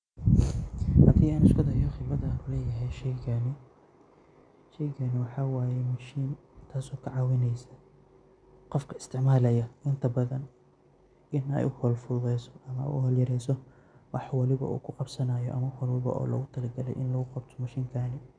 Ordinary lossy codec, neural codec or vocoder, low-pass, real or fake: none; none; 9.9 kHz; real